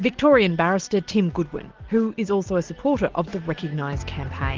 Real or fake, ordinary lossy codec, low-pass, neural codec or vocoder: real; Opus, 16 kbps; 7.2 kHz; none